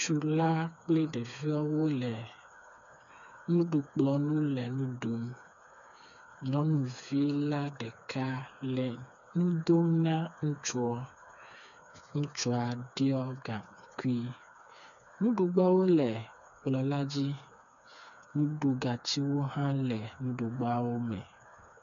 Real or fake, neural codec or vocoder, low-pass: fake; codec, 16 kHz, 4 kbps, FreqCodec, smaller model; 7.2 kHz